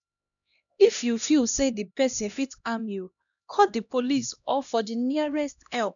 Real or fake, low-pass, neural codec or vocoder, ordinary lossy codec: fake; 7.2 kHz; codec, 16 kHz, 1 kbps, X-Codec, HuBERT features, trained on LibriSpeech; none